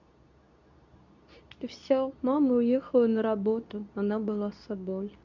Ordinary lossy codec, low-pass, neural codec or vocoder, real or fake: none; 7.2 kHz; codec, 24 kHz, 0.9 kbps, WavTokenizer, medium speech release version 2; fake